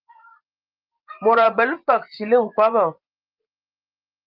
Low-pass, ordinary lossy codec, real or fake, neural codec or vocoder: 5.4 kHz; Opus, 24 kbps; fake; vocoder, 24 kHz, 100 mel bands, Vocos